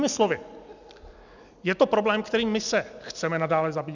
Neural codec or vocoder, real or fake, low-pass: none; real; 7.2 kHz